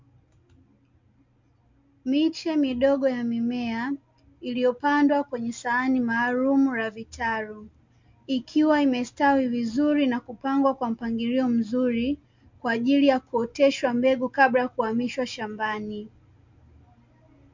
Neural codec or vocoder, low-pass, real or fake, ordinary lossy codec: none; 7.2 kHz; real; MP3, 48 kbps